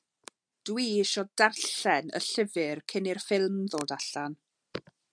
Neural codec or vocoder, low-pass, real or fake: none; 9.9 kHz; real